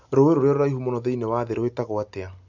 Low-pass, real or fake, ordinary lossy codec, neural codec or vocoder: 7.2 kHz; real; none; none